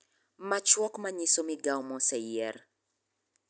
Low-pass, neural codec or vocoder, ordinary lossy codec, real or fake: none; none; none; real